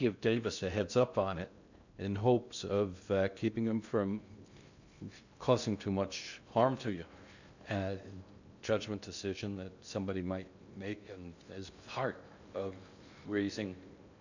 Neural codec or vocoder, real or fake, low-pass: codec, 16 kHz in and 24 kHz out, 0.6 kbps, FocalCodec, streaming, 2048 codes; fake; 7.2 kHz